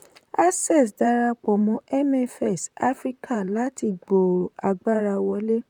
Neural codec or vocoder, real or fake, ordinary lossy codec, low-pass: vocoder, 44.1 kHz, 128 mel bands, Pupu-Vocoder; fake; none; 19.8 kHz